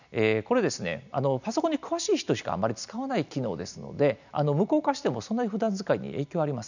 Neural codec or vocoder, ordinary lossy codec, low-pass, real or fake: none; none; 7.2 kHz; real